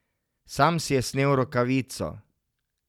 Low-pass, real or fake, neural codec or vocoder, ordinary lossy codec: 19.8 kHz; real; none; none